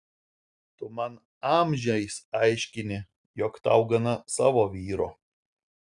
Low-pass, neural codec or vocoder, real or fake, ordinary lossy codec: 10.8 kHz; none; real; Opus, 64 kbps